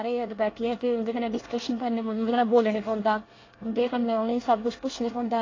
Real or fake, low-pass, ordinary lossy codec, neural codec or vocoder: fake; 7.2 kHz; AAC, 32 kbps; codec, 24 kHz, 1 kbps, SNAC